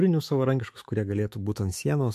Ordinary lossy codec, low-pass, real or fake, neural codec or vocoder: MP3, 64 kbps; 14.4 kHz; fake; autoencoder, 48 kHz, 128 numbers a frame, DAC-VAE, trained on Japanese speech